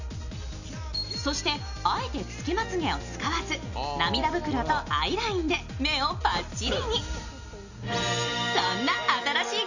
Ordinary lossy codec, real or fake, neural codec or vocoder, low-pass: none; real; none; 7.2 kHz